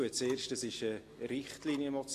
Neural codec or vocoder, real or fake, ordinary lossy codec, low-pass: none; real; AAC, 48 kbps; 14.4 kHz